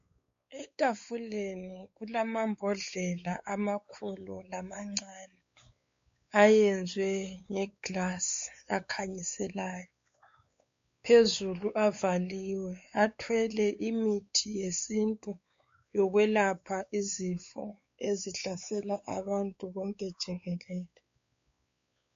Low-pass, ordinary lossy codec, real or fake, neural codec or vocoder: 7.2 kHz; MP3, 48 kbps; fake; codec, 16 kHz, 4 kbps, X-Codec, WavLM features, trained on Multilingual LibriSpeech